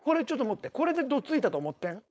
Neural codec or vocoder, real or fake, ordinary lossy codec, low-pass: codec, 16 kHz, 4.8 kbps, FACodec; fake; none; none